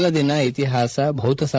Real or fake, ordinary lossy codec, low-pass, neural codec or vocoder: fake; none; none; codec, 16 kHz, 16 kbps, FreqCodec, larger model